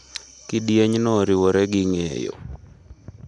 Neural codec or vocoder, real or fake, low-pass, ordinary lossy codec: none; real; 10.8 kHz; none